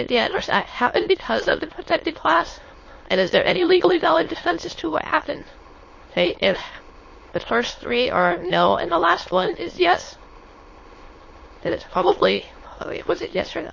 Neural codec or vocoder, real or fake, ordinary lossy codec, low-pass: autoencoder, 22.05 kHz, a latent of 192 numbers a frame, VITS, trained on many speakers; fake; MP3, 32 kbps; 7.2 kHz